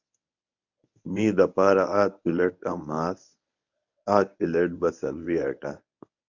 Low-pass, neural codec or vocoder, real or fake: 7.2 kHz; codec, 24 kHz, 0.9 kbps, WavTokenizer, medium speech release version 1; fake